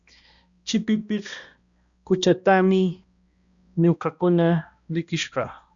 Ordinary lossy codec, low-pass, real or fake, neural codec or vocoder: Opus, 64 kbps; 7.2 kHz; fake; codec, 16 kHz, 1 kbps, X-Codec, HuBERT features, trained on balanced general audio